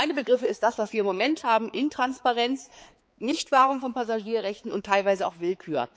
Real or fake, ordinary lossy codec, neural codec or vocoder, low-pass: fake; none; codec, 16 kHz, 4 kbps, X-Codec, HuBERT features, trained on balanced general audio; none